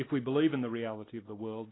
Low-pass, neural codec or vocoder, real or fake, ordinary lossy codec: 7.2 kHz; none; real; AAC, 16 kbps